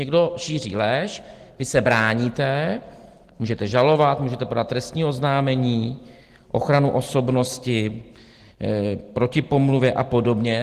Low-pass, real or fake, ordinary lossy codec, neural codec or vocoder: 14.4 kHz; real; Opus, 16 kbps; none